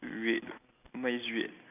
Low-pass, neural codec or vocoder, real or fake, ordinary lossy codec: 3.6 kHz; none; real; none